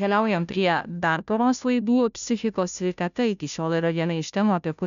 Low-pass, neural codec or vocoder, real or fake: 7.2 kHz; codec, 16 kHz, 0.5 kbps, FunCodec, trained on Chinese and English, 25 frames a second; fake